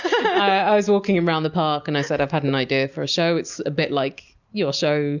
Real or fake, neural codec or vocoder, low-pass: real; none; 7.2 kHz